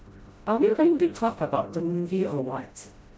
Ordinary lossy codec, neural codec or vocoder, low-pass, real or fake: none; codec, 16 kHz, 0.5 kbps, FreqCodec, smaller model; none; fake